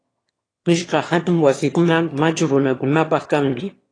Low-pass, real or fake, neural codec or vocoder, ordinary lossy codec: 9.9 kHz; fake; autoencoder, 22.05 kHz, a latent of 192 numbers a frame, VITS, trained on one speaker; AAC, 32 kbps